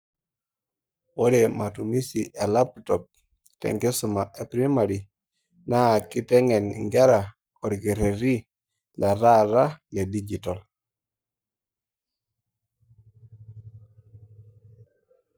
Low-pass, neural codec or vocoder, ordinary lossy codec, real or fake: none; codec, 44.1 kHz, 7.8 kbps, Pupu-Codec; none; fake